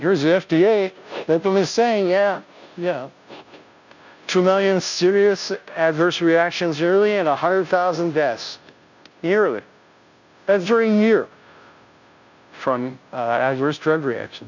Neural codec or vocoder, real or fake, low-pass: codec, 16 kHz, 0.5 kbps, FunCodec, trained on Chinese and English, 25 frames a second; fake; 7.2 kHz